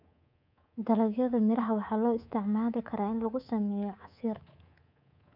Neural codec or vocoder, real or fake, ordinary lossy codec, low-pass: codec, 24 kHz, 3.1 kbps, DualCodec; fake; MP3, 48 kbps; 5.4 kHz